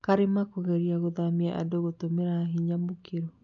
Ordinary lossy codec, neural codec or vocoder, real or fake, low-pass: none; none; real; 7.2 kHz